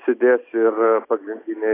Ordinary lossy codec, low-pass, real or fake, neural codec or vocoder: AAC, 16 kbps; 3.6 kHz; real; none